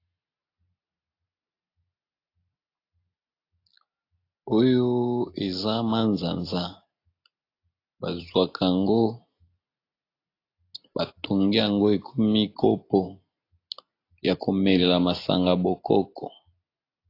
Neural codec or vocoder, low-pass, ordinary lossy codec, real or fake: none; 5.4 kHz; AAC, 32 kbps; real